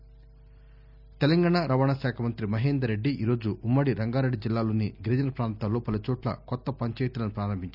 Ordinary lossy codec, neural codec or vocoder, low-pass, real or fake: none; none; 5.4 kHz; real